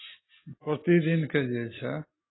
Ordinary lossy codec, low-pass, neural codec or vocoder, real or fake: AAC, 16 kbps; 7.2 kHz; none; real